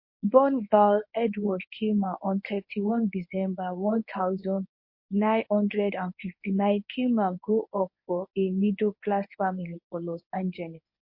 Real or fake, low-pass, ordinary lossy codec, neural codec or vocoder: fake; 5.4 kHz; MP3, 32 kbps; codec, 24 kHz, 0.9 kbps, WavTokenizer, medium speech release version 1